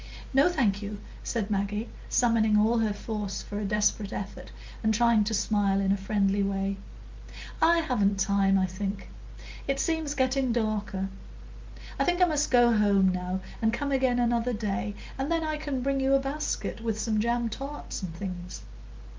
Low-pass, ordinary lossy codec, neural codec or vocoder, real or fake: 7.2 kHz; Opus, 32 kbps; none; real